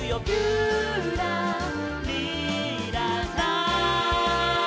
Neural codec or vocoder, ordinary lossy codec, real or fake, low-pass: none; none; real; none